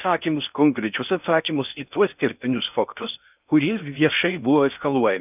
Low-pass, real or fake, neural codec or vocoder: 3.6 kHz; fake; codec, 16 kHz in and 24 kHz out, 0.6 kbps, FocalCodec, streaming, 4096 codes